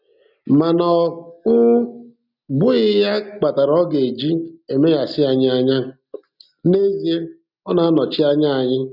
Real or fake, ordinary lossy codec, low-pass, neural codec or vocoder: real; none; 5.4 kHz; none